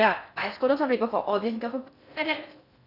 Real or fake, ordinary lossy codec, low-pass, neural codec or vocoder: fake; none; 5.4 kHz; codec, 16 kHz in and 24 kHz out, 0.6 kbps, FocalCodec, streaming, 4096 codes